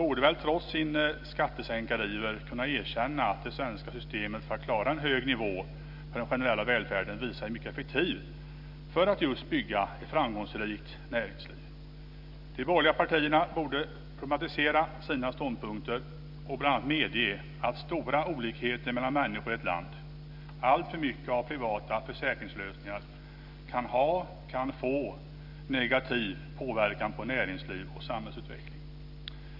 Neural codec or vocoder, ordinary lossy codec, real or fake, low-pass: none; none; real; 5.4 kHz